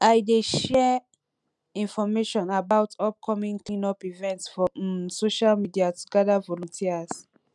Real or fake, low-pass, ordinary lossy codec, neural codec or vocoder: real; 10.8 kHz; none; none